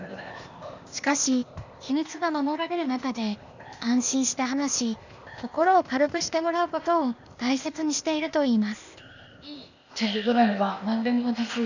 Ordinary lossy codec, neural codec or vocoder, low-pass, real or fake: none; codec, 16 kHz, 0.8 kbps, ZipCodec; 7.2 kHz; fake